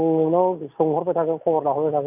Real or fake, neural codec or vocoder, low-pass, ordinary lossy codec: real; none; 3.6 kHz; none